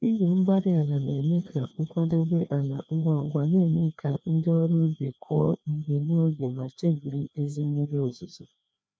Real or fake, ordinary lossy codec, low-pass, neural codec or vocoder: fake; none; none; codec, 16 kHz, 2 kbps, FreqCodec, larger model